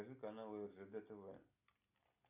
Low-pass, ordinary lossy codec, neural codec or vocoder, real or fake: 3.6 kHz; AAC, 32 kbps; none; real